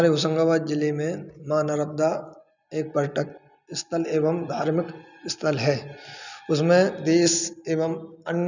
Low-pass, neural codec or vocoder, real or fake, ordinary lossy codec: 7.2 kHz; none; real; none